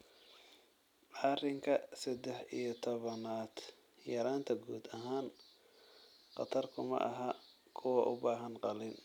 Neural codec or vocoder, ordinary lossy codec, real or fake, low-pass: none; none; real; 19.8 kHz